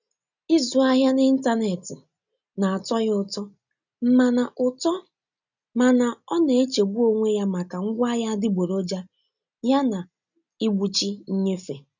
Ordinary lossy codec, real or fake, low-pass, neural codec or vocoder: none; real; 7.2 kHz; none